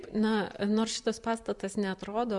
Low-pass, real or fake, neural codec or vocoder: 10.8 kHz; fake; vocoder, 44.1 kHz, 128 mel bands every 512 samples, BigVGAN v2